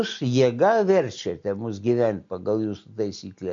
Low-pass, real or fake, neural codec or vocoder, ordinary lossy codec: 7.2 kHz; real; none; MP3, 48 kbps